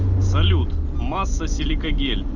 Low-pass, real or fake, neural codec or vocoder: 7.2 kHz; real; none